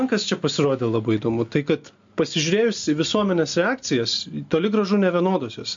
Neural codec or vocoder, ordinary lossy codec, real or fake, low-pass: none; MP3, 48 kbps; real; 7.2 kHz